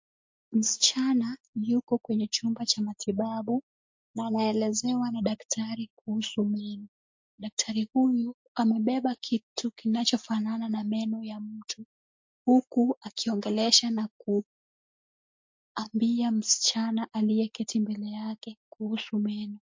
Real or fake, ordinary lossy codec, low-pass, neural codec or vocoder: real; MP3, 48 kbps; 7.2 kHz; none